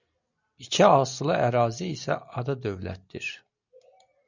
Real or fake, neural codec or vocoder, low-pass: real; none; 7.2 kHz